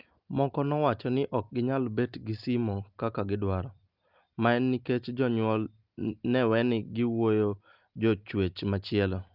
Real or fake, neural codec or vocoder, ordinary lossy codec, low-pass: real; none; Opus, 32 kbps; 5.4 kHz